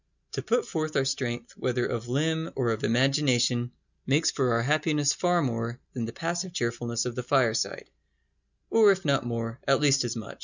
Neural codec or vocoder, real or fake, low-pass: none; real; 7.2 kHz